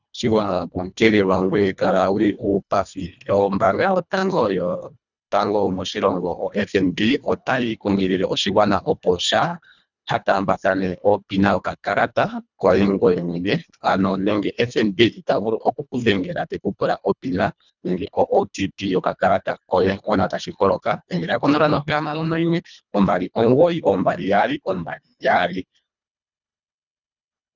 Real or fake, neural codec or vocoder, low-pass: fake; codec, 24 kHz, 1.5 kbps, HILCodec; 7.2 kHz